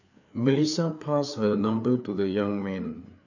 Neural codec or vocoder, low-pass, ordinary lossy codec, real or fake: codec, 16 kHz, 4 kbps, FreqCodec, larger model; 7.2 kHz; none; fake